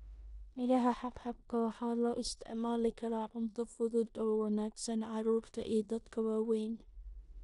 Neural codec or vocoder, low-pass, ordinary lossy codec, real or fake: codec, 16 kHz in and 24 kHz out, 0.9 kbps, LongCat-Audio-Codec, four codebook decoder; 10.8 kHz; none; fake